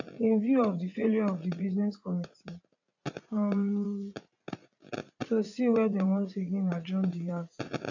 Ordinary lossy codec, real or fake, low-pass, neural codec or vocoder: AAC, 48 kbps; fake; 7.2 kHz; vocoder, 44.1 kHz, 80 mel bands, Vocos